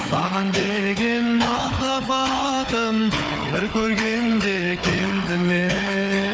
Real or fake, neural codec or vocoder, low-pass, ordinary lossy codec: fake; codec, 16 kHz, 4 kbps, FunCodec, trained on Chinese and English, 50 frames a second; none; none